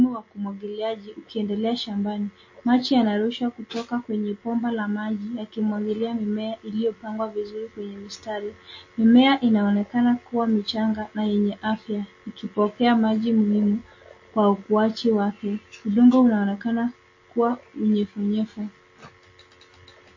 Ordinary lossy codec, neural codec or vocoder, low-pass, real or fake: MP3, 32 kbps; none; 7.2 kHz; real